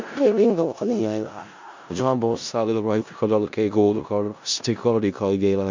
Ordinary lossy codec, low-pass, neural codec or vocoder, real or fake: MP3, 64 kbps; 7.2 kHz; codec, 16 kHz in and 24 kHz out, 0.4 kbps, LongCat-Audio-Codec, four codebook decoder; fake